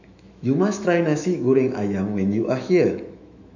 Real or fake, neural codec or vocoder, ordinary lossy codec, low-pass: fake; autoencoder, 48 kHz, 128 numbers a frame, DAC-VAE, trained on Japanese speech; none; 7.2 kHz